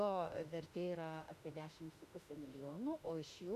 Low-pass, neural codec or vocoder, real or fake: 14.4 kHz; autoencoder, 48 kHz, 32 numbers a frame, DAC-VAE, trained on Japanese speech; fake